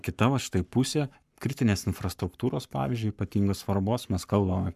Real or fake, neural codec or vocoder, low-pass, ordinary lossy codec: fake; codec, 44.1 kHz, 7.8 kbps, Pupu-Codec; 14.4 kHz; MP3, 96 kbps